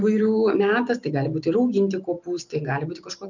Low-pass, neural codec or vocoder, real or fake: 7.2 kHz; none; real